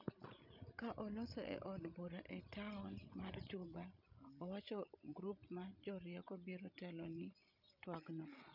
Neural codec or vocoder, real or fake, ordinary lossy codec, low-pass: codec, 16 kHz, 16 kbps, FreqCodec, larger model; fake; none; 5.4 kHz